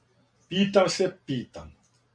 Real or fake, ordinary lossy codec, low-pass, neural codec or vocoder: real; MP3, 64 kbps; 9.9 kHz; none